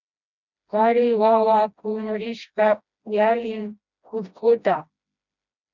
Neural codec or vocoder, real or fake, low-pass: codec, 16 kHz, 1 kbps, FreqCodec, smaller model; fake; 7.2 kHz